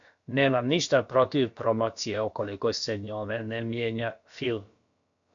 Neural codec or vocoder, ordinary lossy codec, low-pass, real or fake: codec, 16 kHz, about 1 kbps, DyCAST, with the encoder's durations; MP3, 64 kbps; 7.2 kHz; fake